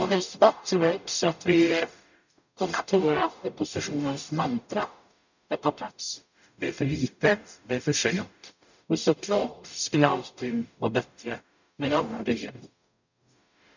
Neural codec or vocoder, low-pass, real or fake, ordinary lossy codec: codec, 44.1 kHz, 0.9 kbps, DAC; 7.2 kHz; fake; none